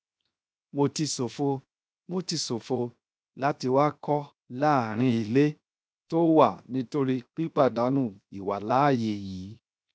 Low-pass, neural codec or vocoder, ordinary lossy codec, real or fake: none; codec, 16 kHz, 0.7 kbps, FocalCodec; none; fake